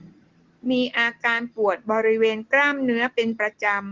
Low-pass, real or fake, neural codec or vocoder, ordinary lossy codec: 7.2 kHz; real; none; Opus, 16 kbps